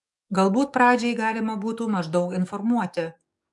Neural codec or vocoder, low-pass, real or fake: codec, 44.1 kHz, 7.8 kbps, DAC; 10.8 kHz; fake